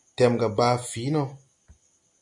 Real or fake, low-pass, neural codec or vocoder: real; 10.8 kHz; none